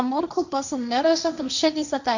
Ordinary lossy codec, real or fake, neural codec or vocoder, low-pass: none; fake; codec, 16 kHz, 1.1 kbps, Voila-Tokenizer; 7.2 kHz